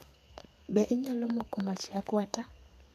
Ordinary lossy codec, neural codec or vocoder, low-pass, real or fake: MP3, 96 kbps; codec, 32 kHz, 1.9 kbps, SNAC; 14.4 kHz; fake